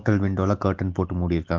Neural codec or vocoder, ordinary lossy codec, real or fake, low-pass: none; Opus, 16 kbps; real; 7.2 kHz